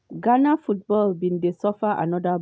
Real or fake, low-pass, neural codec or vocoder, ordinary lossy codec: real; none; none; none